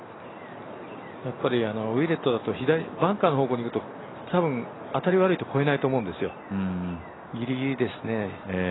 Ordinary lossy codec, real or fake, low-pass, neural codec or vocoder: AAC, 16 kbps; real; 7.2 kHz; none